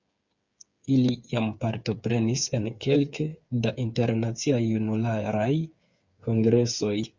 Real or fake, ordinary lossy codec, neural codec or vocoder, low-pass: fake; Opus, 64 kbps; codec, 16 kHz, 8 kbps, FreqCodec, smaller model; 7.2 kHz